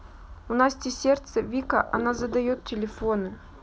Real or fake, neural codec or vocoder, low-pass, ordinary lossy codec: real; none; none; none